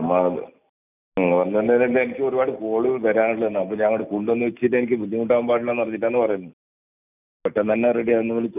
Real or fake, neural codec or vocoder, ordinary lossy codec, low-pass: real; none; none; 3.6 kHz